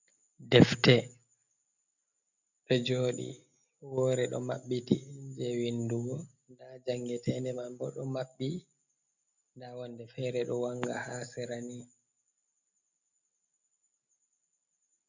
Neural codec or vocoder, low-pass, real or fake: none; 7.2 kHz; real